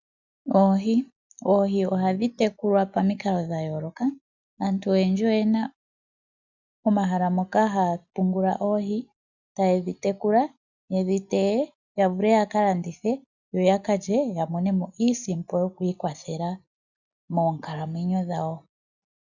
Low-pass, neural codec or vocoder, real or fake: 7.2 kHz; none; real